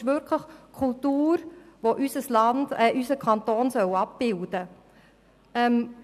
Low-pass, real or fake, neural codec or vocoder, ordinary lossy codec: 14.4 kHz; real; none; none